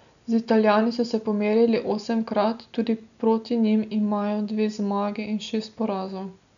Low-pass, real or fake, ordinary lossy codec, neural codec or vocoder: 7.2 kHz; real; none; none